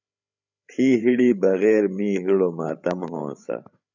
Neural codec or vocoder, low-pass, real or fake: codec, 16 kHz, 8 kbps, FreqCodec, larger model; 7.2 kHz; fake